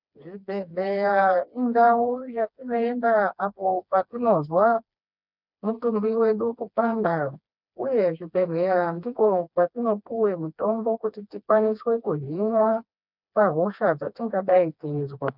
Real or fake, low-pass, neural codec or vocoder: fake; 5.4 kHz; codec, 16 kHz, 2 kbps, FreqCodec, smaller model